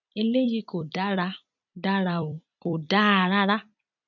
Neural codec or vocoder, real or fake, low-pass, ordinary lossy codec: vocoder, 44.1 kHz, 128 mel bands every 256 samples, BigVGAN v2; fake; 7.2 kHz; none